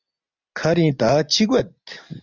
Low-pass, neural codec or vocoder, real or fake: 7.2 kHz; none; real